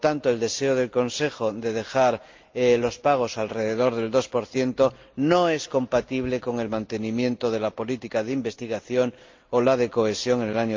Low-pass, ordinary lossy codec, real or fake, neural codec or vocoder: 7.2 kHz; Opus, 24 kbps; real; none